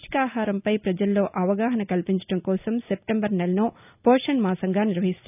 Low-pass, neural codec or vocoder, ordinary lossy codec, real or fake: 3.6 kHz; none; none; real